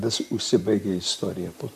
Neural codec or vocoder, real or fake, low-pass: vocoder, 44.1 kHz, 128 mel bands, Pupu-Vocoder; fake; 14.4 kHz